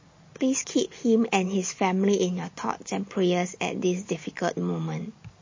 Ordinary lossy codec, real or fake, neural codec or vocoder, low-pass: MP3, 32 kbps; real; none; 7.2 kHz